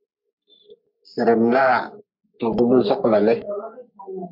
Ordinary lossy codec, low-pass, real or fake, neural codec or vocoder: MP3, 48 kbps; 5.4 kHz; fake; codec, 44.1 kHz, 3.4 kbps, Pupu-Codec